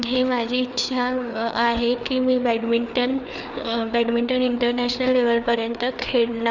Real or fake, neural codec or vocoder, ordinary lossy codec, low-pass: fake; codec, 16 kHz, 4 kbps, FreqCodec, larger model; none; 7.2 kHz